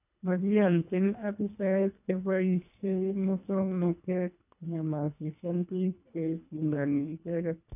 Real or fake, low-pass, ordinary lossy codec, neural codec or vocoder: fake; 3.6 kHz; none; codec, 24 kHz, 1.5 kbps, HILCodec